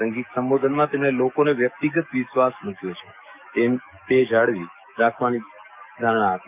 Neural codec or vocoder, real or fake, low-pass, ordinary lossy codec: codec, 44.1 kHz, 7.8 kbps, DAC; fake; 3.6 kHz; none